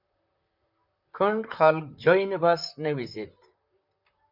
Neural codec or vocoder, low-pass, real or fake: vocoder, 44.1 kHz, 128 mel bands, Pupu-Vocoder; 5.4 kHz; fake